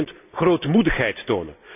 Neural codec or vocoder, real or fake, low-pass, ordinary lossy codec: none; real; 3.6 kHz; none